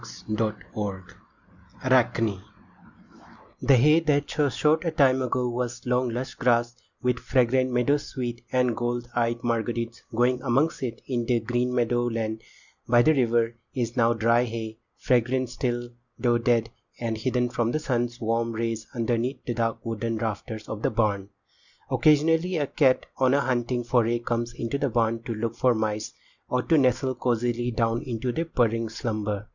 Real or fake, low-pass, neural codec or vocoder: real; 7.2 kHz; none